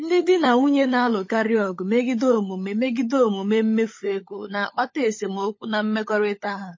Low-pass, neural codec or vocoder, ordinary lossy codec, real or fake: 7.2 kHz; codec, 16 kHz, 16 kbps, FunCodec, trained on LibriTTS, 50 frames a second; MP3, 32 kbps; fake